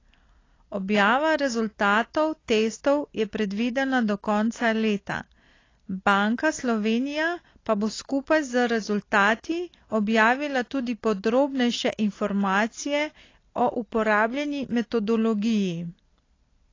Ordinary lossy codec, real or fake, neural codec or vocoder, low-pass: AAC, 32 kbps; real; none; 7.2 kHz